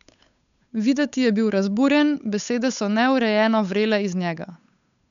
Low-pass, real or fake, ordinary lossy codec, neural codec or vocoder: 7.2 kHz; fake; none; codec, 16 kHz, 8 kbps, FunCodec, trained on Chinese and English, 25 frames a second